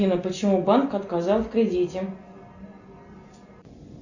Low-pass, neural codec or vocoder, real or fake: 7.2 kHz; none; real